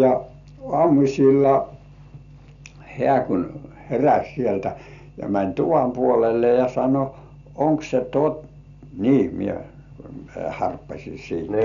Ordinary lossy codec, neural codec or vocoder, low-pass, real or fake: none; none; 7.2 kHz; real